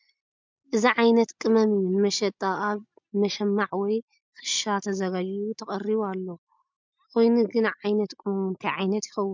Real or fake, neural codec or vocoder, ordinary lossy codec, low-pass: real; none; MP3, 64 kbps; 7.2 kHz